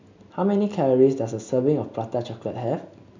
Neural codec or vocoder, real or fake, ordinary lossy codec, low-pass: none; real; MP3, 64 kbps; 7.2 kHz